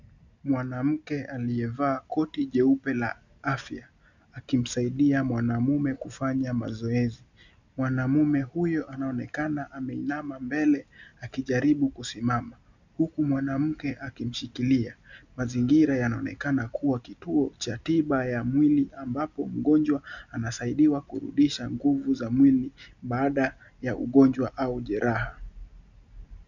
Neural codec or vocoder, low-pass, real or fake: none; 7.2 kHz; real